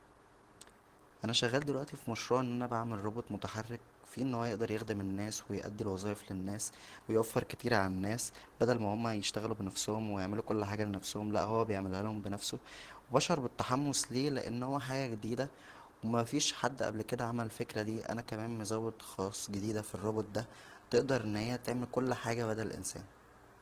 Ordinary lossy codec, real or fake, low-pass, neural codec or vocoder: Opus, 16 kbps; fake; 14.4 kHz; vocoder, 44.1 kHz, 128 mel bands every 512 samples, BigVGAN v2